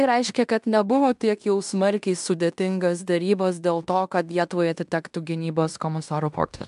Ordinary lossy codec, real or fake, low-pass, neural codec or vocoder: AAC, 96 kbps; fake; 10.8 kHz; codec, 16 kHz in and 24 kHz out, 0.9 kbps, LongCat-Audio-Codec, fine tuned four codebook decoder